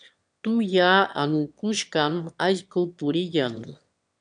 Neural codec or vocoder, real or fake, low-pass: autoencoder, 22.05 kHz, a latent of 192 numbers a frame, VITS, trained on one speaker; fake; 9.9 kHz